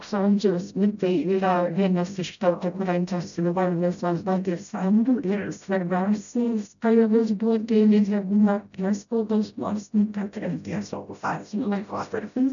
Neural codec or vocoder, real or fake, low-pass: codec, 16 kHz, 0.5 kbps, FreqCodec, smaller model; fake; 7.2 kHz